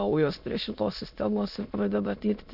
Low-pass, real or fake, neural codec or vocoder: 5.4 kHz; fake; autoencoder, 22.05 kHz, a latent of 192 numbers a frame, VITS, trained on many speakers